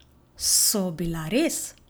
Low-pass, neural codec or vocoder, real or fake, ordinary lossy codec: none; none; real; none